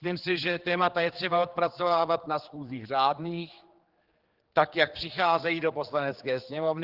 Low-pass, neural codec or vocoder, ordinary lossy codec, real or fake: 5.4 kHz; codec, 16 kHz, 4 kbps, X-Codec, HuBERT features, trained on general audio; Opus, 16 kbps; fake